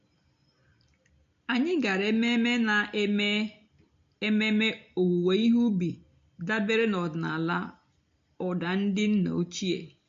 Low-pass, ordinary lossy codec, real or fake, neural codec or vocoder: 7.2 kHz; MP3, 48 kbps; real; none